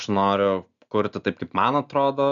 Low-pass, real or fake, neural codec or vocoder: 7.2 kHz; real; none